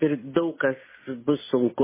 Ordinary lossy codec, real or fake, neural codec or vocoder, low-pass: MP3, 16 kbps; real; none; 3.6 kHz